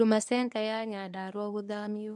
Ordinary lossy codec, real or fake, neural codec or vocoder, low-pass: none; fake; codec, 24 kHz, 0.9 kbps, WavTokenizer, medium speech release version 1; none